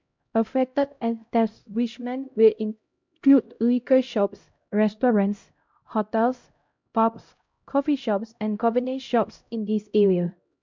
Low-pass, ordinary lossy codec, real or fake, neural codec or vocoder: 7.2 kHz; MP3, 64 kbps; fake; codec, 16 kHz, 0.5 kbps, X-Codec, HuBERT features, trained on LibriSpeech